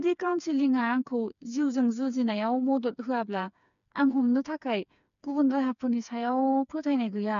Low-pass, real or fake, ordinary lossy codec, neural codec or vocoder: 7.2 kHz; fake; none; codec, 16 kHz, 4 kbps, FreqCodec, smaller model